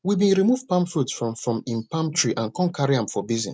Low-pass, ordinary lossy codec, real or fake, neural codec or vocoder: none; none; real; none